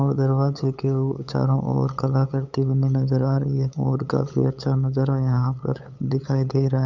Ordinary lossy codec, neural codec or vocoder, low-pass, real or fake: none; codec, 16 kHz, 8 kbps, FunCodec, trained on LibriTTS, 25 frames a second; 7.2 kHz; fake